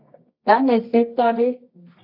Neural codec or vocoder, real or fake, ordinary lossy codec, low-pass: codec, 24 kHz, 0.9 kbps, WavTokenizer, medium music audio release; fake; AAC, 32 kbps; 5.4 kHz